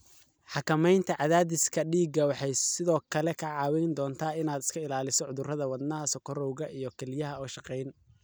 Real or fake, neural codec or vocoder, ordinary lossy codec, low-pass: real; none; none; none